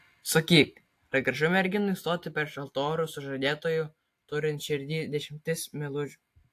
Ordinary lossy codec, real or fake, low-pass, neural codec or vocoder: AAC, 64 kbps; real; 14.4 kHz; none